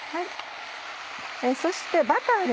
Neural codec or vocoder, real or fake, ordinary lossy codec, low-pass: none; real; none; none